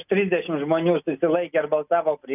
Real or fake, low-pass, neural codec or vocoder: real; 3.6 kHz; none